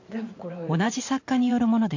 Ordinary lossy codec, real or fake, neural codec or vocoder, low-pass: AAC, 48 kbps; fake; vocoder, 44.1 kHz, 128 mel bands every 512 samples, BigVGAN v2; 7.2 kHz